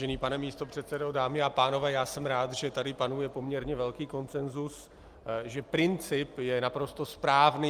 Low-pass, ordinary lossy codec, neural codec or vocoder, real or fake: 14.4 kHz; Opus, 32 kbps; none; real